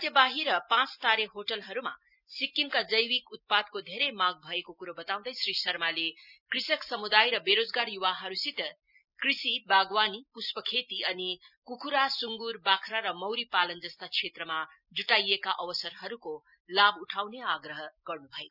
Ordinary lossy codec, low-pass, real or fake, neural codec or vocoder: none; 5.4 kHz; real; none